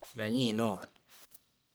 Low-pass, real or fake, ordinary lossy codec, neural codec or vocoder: none; fake; none; codec, 44.1 kHz, 1.7 kbps, Pupu-Codec